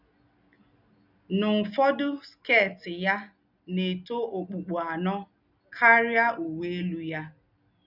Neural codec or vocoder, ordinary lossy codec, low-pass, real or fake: none; none; 5.4 kHz; real